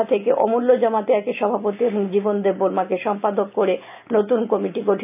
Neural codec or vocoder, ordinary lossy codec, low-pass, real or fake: none; none; 3.6 kHz; real